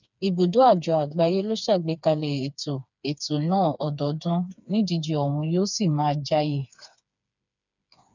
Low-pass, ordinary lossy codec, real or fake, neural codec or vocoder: 7.2 kHz; none; fake; codec, 16 kHz, 4 kbps, FreqCodec, smaller model